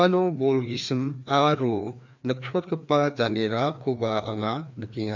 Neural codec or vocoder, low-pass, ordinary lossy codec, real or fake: codec, 16 kHz, 2 kbps, FreqCodec, larger model; 7.2 kHz; MP3, 64 kbps; fake